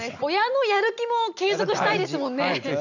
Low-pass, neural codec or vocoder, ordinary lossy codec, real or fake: 7.2 kHz; none; none; real